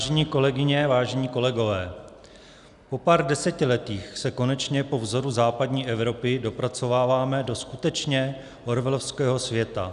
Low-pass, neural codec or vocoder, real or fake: 10.8 kHz; none; real